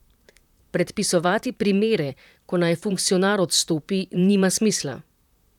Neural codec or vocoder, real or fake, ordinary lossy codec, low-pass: vocoder, 44.1 kHz, 128 mel bands, Pupu-Vocoder; fake; none; 19.8 kHz